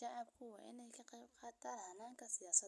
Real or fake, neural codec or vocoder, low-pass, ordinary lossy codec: real; none; none; none